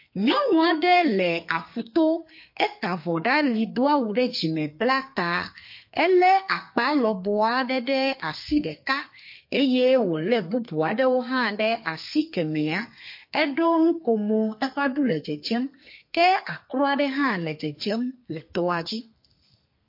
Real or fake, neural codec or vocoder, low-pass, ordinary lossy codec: fake; codec, 32 kHz, 1.9 kbps, SNAC; 5.4 kHz; MP3, 32 kbps